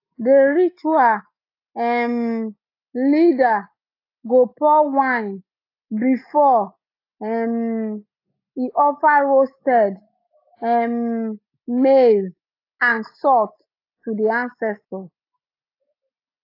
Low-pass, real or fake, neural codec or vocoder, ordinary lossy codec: 5.4 kHz; real; none; AAC, 32 kbps